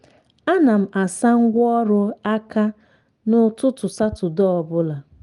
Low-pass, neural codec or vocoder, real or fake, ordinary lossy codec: 10.8 kHz; none; real; Opus, 32 kbps